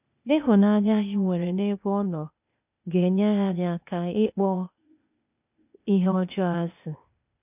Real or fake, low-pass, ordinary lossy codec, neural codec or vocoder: fake; 3.6 kHz; none; codec, 16 kHz, 0.8 kbps, ZipCodec